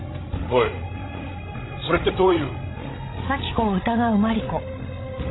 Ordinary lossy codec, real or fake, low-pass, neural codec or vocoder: AAC, 16 kbps; fake; 7.2 kHz; codec, 16 kHz, 16 kbps, FreqCodec, larger model